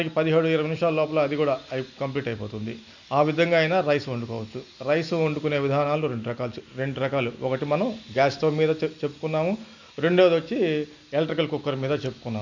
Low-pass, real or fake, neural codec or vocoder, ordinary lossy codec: 7.2 kHz; real; none; none